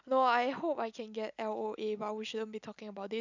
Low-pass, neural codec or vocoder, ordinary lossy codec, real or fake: 7.2 kHz; none; none; real